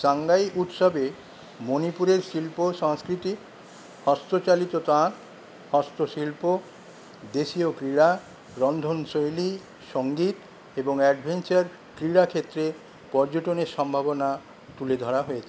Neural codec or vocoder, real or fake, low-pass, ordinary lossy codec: none; real; none; none